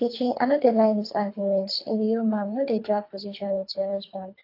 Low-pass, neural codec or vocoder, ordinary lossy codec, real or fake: 5.4 kHz; codec, 24 kHz, 3 kbps, HILCodec; AAC, 32 kbps; fake